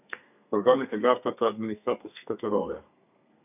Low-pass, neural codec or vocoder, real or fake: 3.6 kHz; codec, 32 kHz, 1.9 kbps, SNAC; fake